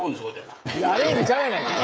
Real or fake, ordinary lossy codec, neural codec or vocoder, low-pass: fake; none; codec, 16 kHz, 16 kbps, FreqCodec, smaller model; none